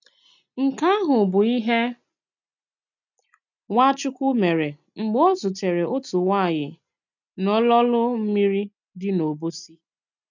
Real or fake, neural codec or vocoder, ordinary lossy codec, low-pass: real; none; none; 7.2 kHz